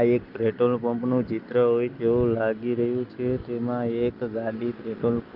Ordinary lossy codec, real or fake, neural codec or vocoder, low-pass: Opus, 32 kbps; real; none; 5.4 kHz